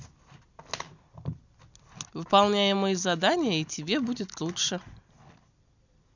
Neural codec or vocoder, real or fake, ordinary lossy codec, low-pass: none; real; none; 7.2 kHz